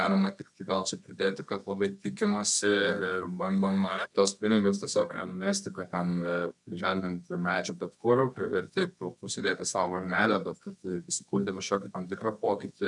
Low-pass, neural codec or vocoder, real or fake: 10.8 kHz; codec, 24 kHz, 0.9 kbps, WavTokenizer, medium music audio release; fake